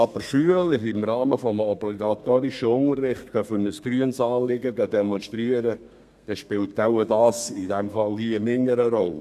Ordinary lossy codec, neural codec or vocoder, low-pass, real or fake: none; codec, 32 kHz, 1.9 kbps, SNAC; 14.4 kHz; fake